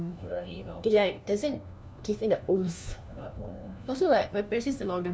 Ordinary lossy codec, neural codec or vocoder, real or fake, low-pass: none; codec, 16 kHz, 1 kbps, FunCodec, trained on LibriTTS, 50 frames a second; fake; none